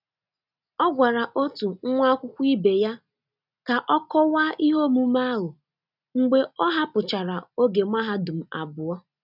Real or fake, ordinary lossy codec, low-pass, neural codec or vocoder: real; none; 5.4 kHz; none